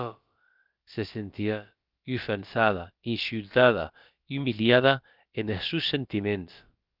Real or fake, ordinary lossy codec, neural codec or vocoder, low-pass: fake; Opus, 32 kbps; codec, 16 kHz, about 1 kbps, DyCAST, with the encoder's durations; 5.4 kHz